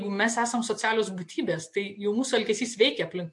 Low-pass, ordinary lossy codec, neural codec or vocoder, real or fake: 10.8 kHz; MP3, 48 kbps; none; real